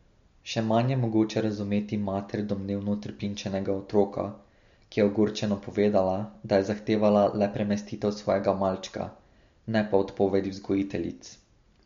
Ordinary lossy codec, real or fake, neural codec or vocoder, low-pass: MP3, 48 kbps; real; none; 7.2 kHz